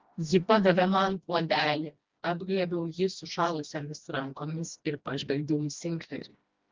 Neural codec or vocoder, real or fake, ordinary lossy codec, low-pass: codec, 16 kHz, 1 kbps, FreqCodec, smaller model; fake; Opus, 32 kbps; 7.2 kHz